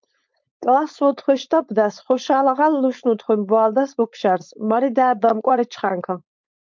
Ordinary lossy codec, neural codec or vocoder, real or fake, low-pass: MP3, 64 kbps; codec, 16 kHz, 4.8 kbps, FACodec; fake; 7.2 kHz